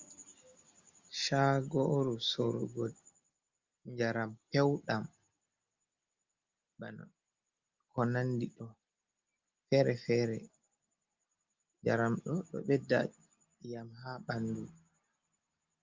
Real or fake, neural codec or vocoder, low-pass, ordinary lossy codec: real; none; 7.2 kHz; Opus, 32 kbps